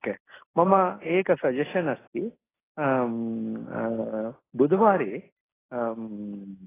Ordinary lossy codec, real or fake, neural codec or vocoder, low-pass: AAC, 16 kbps; real; none; 3.6 kHz